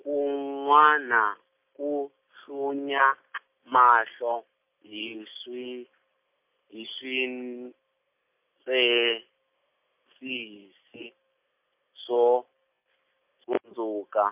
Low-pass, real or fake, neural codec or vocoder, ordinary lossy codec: 3.6 kHz; real; none; MP3, 32 kbps